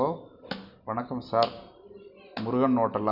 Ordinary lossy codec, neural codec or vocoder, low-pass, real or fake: none; none; 5.4 kHz; real